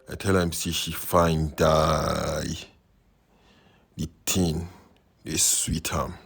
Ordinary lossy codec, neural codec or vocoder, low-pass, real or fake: none; none; none; real